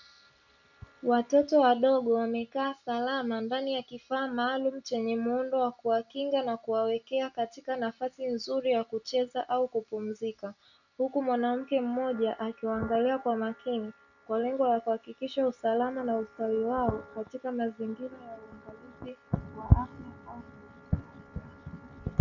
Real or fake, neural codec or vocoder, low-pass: real; none; 7.2 kHz